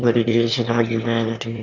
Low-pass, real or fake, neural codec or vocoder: 7.2 kHz; fake; autoencoder, 22.05 kHz, a latent of 192 numbers a frame, VITS, trained on one speaker